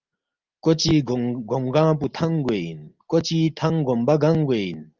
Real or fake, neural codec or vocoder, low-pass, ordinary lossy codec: real; none; 7.2 kHz; Opus, 32 kbps